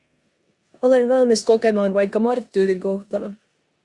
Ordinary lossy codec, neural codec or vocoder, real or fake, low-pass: Opus, 64 kbps; codec, 16 kHz in and 24 kHz out, 0.9 kbps, LongCat-Audio-Codec, four codebook decoder; fake; 10.8 kHz